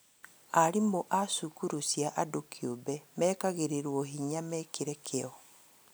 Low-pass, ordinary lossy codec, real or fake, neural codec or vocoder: none; none; real; none